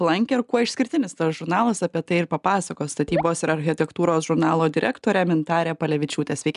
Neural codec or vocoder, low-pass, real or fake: none; 10.8 kHz; real